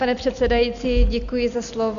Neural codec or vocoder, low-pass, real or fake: none; 7.2 kHz; real